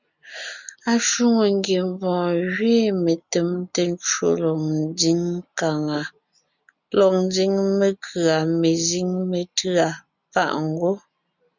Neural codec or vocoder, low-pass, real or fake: none; 7.2 kHz; real